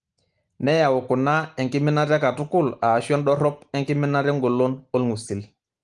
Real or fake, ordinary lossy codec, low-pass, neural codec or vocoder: real; Opus, 24 kbps; 10.8 kHz; none